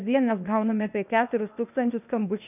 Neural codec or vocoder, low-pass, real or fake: codec, 16 kHz, 0.8 kbps, ZipCodec; 3.6 kHz; fake